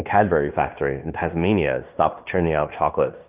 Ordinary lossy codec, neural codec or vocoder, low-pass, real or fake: Opus, 24 kbps; codec, 16 kHz in and 24 kHz out, 0.9 kbps, LongCat-Audio-Codec, fine tuned four codebook decoder; 3.6 kHz; fake